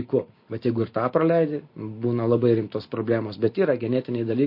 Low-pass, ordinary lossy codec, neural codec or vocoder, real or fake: 5.4 kHz; MP3, 32 kbps; none; real